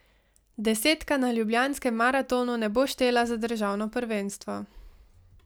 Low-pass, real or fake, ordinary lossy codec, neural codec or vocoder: none; real; none; none